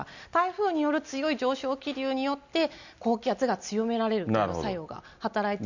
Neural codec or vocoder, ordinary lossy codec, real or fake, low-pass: none; none; real; 7.2 kHz